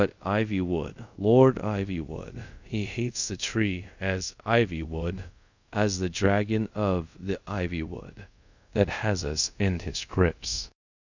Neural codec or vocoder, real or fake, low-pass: codec, 24 kHz, 0.5 kbps, DualCodec; fake; 7.2 kHz